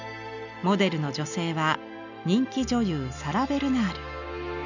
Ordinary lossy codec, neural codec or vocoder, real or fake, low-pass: none; none; real; 7.2 kHz